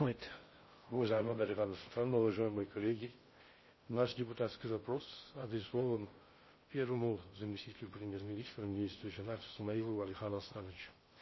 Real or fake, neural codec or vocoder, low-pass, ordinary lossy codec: fake; codec, 16 kHz in and 24 kHz out, 0.6 kbps, FocalCodec, streaming, 4096 codes; 7.2 kHz; MP3, 24 kbps